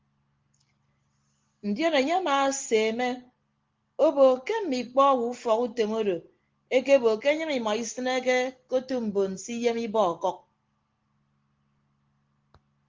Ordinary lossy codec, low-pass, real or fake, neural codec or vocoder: Opus, 16 kbps; 7.2 kHz; real; none